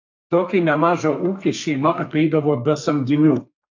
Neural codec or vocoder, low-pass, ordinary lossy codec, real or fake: codec, 32 kHz, 1.9 kbps, SNAC; 7.2 kHz; AAC, 48 kbps; fake